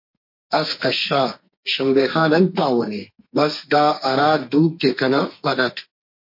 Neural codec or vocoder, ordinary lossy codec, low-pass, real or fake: codec, 32 kHz, 1.9 kbps, SNAC; MP3, 32 kbps; 5.4 kHz; fake